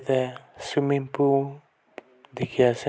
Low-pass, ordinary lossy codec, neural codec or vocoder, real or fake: none; none; none; real